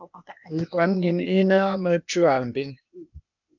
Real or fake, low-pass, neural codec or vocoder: fake; 7.2 kHz; codec, 16 kHz, 0.8 kbps, ZipCodec